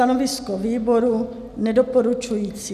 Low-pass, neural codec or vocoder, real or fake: 14.4 kHz; none; real